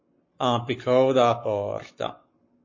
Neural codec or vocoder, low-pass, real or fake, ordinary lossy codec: codec, 44.1 kHz, 7.8 kbps, Pupu-Codec; 7.2 kHz; fake; MP3, 32 kbps